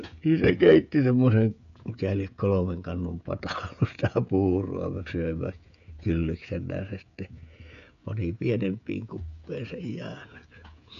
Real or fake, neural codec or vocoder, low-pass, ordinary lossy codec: fake; codec, 16 kHz, 16 kbps, FreqCodec, smaller model; 7.2 kHz; none